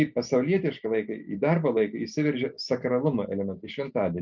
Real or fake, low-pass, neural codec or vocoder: real; 7.2 kHz; none